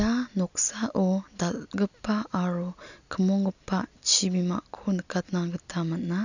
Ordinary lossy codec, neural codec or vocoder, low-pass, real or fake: none; none; 7.2 kHz; real